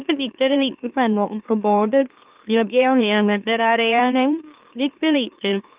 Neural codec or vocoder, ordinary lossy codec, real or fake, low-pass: autoencoder, 44.1 kHz, a latent of 192 numbers a frame, MeloTTS; Opus, 32 kbps; fake; 3.6 kHz